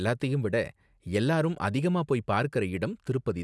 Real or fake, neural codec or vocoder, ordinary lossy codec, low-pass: real; none; none; none